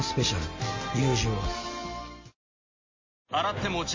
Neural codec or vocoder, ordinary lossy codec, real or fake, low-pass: none; MP3, 32 kbps; real; 7.2 kHz